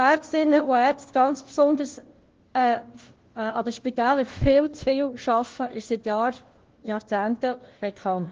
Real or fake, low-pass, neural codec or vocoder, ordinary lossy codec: fake; 7.2 kHz; codec, 16 kHz, 1 kbps, FunCodec, trained on LibriTTS, 50 frames a second; Opus, 16 kbps